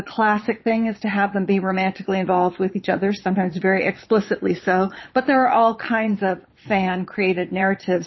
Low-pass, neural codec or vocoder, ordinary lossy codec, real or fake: 7.2 kHz; vocoder, 22.05 kHz, 80 mel bands, Vocos; MP3, 24 kbps; fake